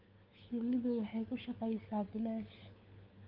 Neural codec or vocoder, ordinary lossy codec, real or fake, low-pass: codec, 16 kHz, 4 kbps, FunCodec, trained on LibriTTS, 50 frames a second; Opus, 32 kbps; fake; 5.4 kHz